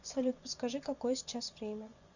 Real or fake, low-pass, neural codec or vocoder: fake; 7.2 kHz; vocoder, 24 kHz, 100 mel bands, Vocos